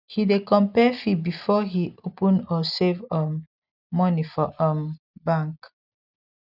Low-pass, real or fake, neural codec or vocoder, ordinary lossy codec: 5.4 kHz; real; none; none